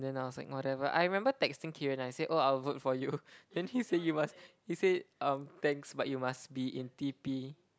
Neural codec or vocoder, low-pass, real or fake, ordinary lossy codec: none; none; real; none